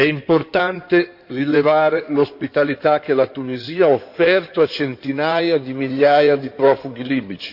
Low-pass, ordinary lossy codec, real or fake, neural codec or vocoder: 5.4 kHz; none; fake; codec, 16 kHz in and 24 kHz out, 2.2 kbps, FireRedTTS-2 codec